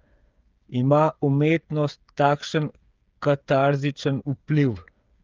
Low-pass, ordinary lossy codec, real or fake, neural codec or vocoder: 7.2 kHz; Opus, 24 kbps; fake; codec, 16 kHz, 8 kbps, FreqCodec, smaller model